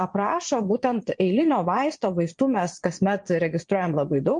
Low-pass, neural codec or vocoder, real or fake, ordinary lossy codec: 9.9 kHz; vocoder, 22.05 kHz, 80 mel bands, Vocos; fake; MP3, 48 kbps